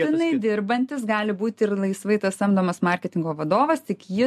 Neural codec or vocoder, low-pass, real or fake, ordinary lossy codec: none; 14.4 kHz; real; MP3, 64 kbps